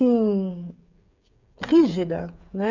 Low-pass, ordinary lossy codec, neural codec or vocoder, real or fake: 7.2 kHz; none; codec, 16 kHz, 8 kbps, FreqCodec, smaller model; fake